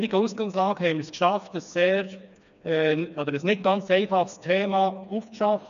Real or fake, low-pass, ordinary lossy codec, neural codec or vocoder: fake; 7.2 kHz; AAC, 96 kbps; codec, 16 kHz, 2 kbps, FreqCodec, smaller model